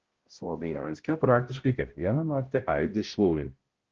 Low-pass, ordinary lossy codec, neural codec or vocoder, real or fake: 7.2 kHz; Opus, 16 kbps; codec, 16 kHz, 0.5 kbps, X-Codec, HuBERT features, trained on balanced general audio; fake